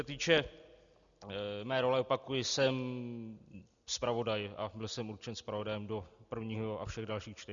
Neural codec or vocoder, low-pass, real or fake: none; 7.2 kHz; real